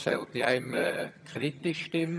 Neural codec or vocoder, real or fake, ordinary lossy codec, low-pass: vocoder, 22.05 kHz, 80 mel bands, HiFi-GAN; fake; none; none